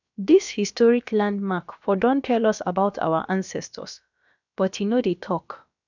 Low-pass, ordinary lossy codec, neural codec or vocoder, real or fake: 7.2 kHz; none; codec, 16 kHz, about 1 kbps, DyCAST, with the encoder's durations; fake